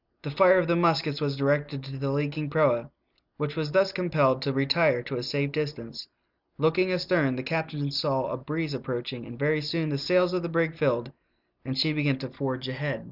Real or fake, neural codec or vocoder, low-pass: real; none; 5.4 kHz